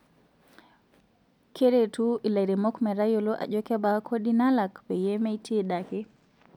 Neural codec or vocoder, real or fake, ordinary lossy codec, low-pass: none; real; none; 19.8 kHz